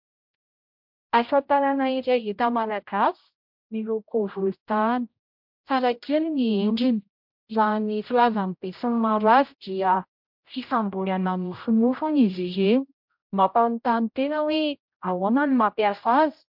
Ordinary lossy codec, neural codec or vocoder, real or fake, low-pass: MP3, 48 kbps; codec, 16 kHz, 0.5 kbps, X-Codec, HuBERT features, trained on general audio; fake; 5.4 kHz